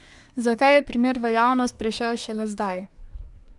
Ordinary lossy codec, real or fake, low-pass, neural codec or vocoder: none; fake; 10.8 kHz; codec, 24 kHz, 1 kbps, SNAC